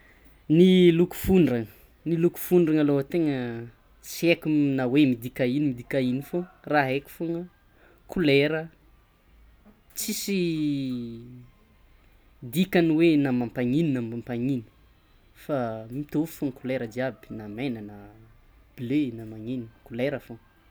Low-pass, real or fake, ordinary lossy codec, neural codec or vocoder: none; real; none; none